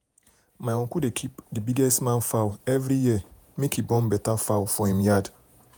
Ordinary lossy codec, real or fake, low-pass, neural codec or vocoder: none; fake; none; vocoder, 48 kHz, 128 mel bands, Vocos